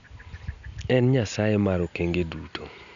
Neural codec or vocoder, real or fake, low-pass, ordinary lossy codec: none; real; 7.2 kHz; none